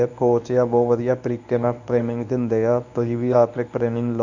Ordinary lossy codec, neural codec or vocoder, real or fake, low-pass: none; codec, 24 kHz, 0.9 kbps, WavTokenizer, medium speech release version 1; fake; 7.2 kHz